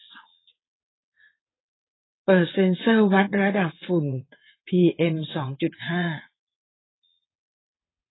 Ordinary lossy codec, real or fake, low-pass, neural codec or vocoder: AAC, 16 kbps; fake; 7.2 kHz; vocoder, 22.05 kHz, 80 mel bands, WaveNeXt